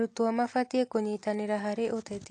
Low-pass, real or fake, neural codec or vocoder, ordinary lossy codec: 9.9 kHz; real; none; AAC, 48 kbps